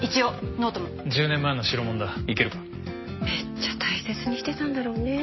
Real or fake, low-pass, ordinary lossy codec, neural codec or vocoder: real; 7.2 kHz; MP3, 24 kbps; none